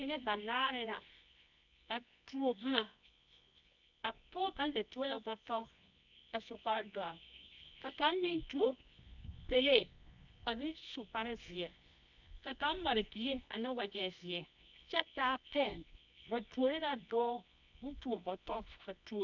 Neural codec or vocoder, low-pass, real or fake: codec, 24 kHz, 0.9 kbps, WavTokenizer, medium music audio release; 7.2 kHz; fake